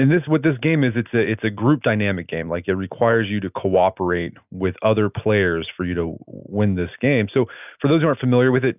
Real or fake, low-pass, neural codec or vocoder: real; 3.6 kHz; none